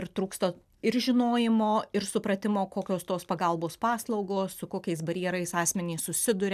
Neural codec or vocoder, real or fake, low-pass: none; real; 14.4 kHz